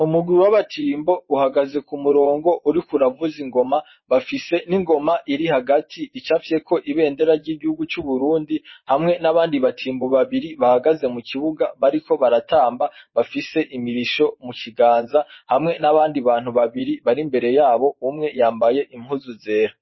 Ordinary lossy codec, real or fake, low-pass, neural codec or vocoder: MP3, 24 kbps; fake; 7.2 kHz; vocoder, 24 kHz, 100 mel bands, Vocos